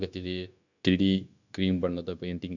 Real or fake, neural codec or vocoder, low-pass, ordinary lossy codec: fake; codec, 16 kHz, 0.9 kbps, LongCat-Audio-Codec; 7.2 kHz; none